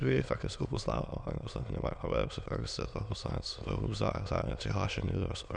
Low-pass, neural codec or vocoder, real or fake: 9.9 kHz; autoencoder, 22.05 kHz, a latent of 192 numbers a frame, VITS, trained on many speakers; fake